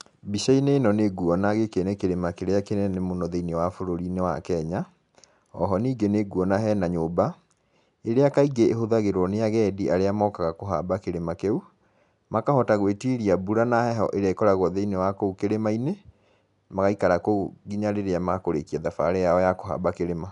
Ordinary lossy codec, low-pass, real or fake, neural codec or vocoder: none; 10.8 kHz; real; none